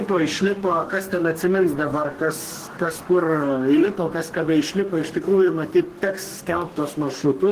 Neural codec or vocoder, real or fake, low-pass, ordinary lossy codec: codec, 44.1 kHz, 2.6 kbps, DAC; fake; 14.4 kHz; Opus, 16 kbps